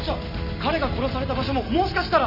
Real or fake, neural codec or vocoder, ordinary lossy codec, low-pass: real; none; none; 5.4 kHz